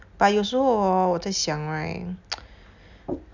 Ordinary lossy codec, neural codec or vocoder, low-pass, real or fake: none; none; 7.2 kHz; real